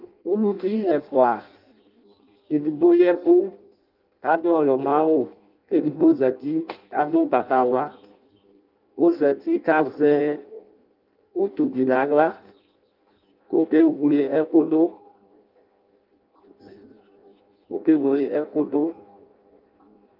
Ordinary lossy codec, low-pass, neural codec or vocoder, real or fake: Opus, 24 kbps; 5.4 kHz; codec, 16 kHz in and 24 kHz out, 0.6 kbps, FireRedTTS-2 codec; fake